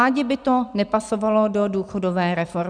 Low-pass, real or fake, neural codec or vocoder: 9.9 kHz; real; none